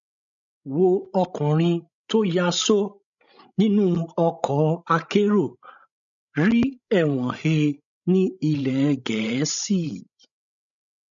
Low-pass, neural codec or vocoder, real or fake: 7.2 kHz; codec, 16 kHz, 16 kbps, FreqCodec, larger model; fake